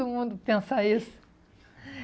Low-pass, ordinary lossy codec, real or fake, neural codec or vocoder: none; none; real; none